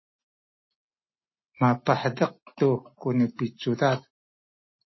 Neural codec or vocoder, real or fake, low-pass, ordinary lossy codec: none; real; 7.2 kHz; MP3, 24 kbps